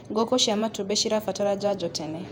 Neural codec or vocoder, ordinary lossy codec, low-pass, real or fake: vocoder, 48 kHz, 128 mel bands, Vocos; none; 19.8 kHz; fake